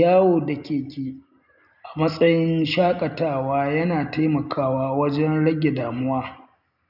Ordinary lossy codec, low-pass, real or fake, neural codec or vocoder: none; 5.4 kHz; real; none